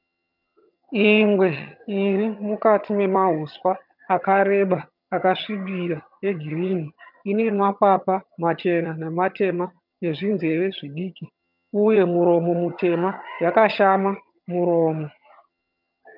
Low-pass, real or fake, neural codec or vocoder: 5.4 kHz; fake; vocoder, 22.05 kHz, 80 mel bands, HiFi-GAN